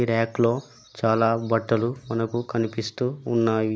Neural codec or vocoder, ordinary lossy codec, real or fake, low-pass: none; none; real; none